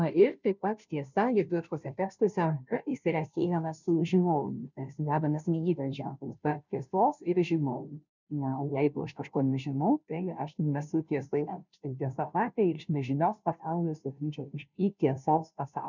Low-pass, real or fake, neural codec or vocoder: 7.2 kHz; fake; codec, 16 kHz, 0.5 kbps, FunCodec, trained on Chinese and English, 25 frames a second